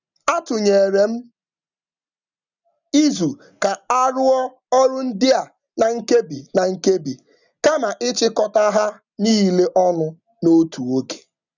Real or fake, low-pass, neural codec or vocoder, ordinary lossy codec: real; 7.2 kHz; none; none